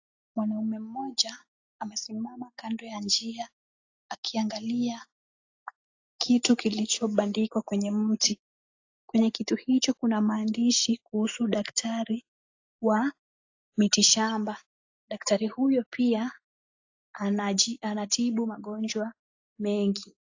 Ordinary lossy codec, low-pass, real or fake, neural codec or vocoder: AAC, 48 kbps; 7.2 kHz; real; none